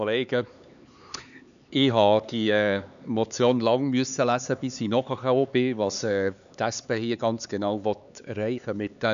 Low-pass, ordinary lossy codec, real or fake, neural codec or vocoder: 7.2 kHz; none; fake; codec, 16 kHz, 4 kbps, X-Codec, HuBERT features, trained on LibriSpeech